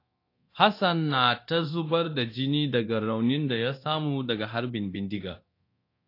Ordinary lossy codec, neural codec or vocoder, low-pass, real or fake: AAC, 32 kbps; codec, 24 kHz, 0.9 kbps, DualCodec; 5.4 kHz; fake